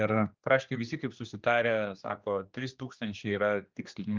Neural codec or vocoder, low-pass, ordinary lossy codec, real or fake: codec, 16 kHz, 2 kbps, X-Codec, HuBERT features, trained on general audio; 7.2 kHz; Opus, 24 kbps; fake